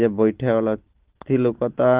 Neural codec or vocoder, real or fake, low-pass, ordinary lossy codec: none; real; 3.6 kHz; Opus, 16 kbps